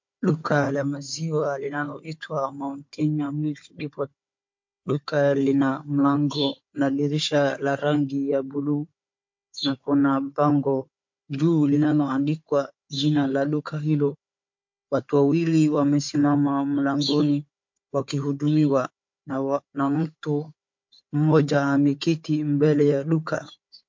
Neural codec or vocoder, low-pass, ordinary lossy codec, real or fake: codec, 16 kHz, 4 kbps, FunCodec, trained on Chinese and English, 50 frames a second; 7.2 kHz; MP3, 48 kbps; fake